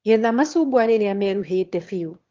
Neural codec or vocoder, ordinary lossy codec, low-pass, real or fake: autoencoder, 22.05 kHz, a latent of 192 numbers a frame, VITS, trained on one speaker; Opus, 16 kbps; 7.2 kHz; fake